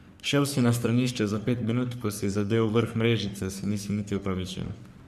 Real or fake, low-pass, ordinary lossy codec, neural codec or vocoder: fake; 14.4 kHz; none; codec, 44.1 kHz, 3.4 kbps, Pupu-Codec